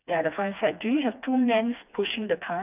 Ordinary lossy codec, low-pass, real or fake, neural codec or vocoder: none; 3.6 kHz; fake; codec, 16 kHz, 2 kbps, FreqCodec, smaller model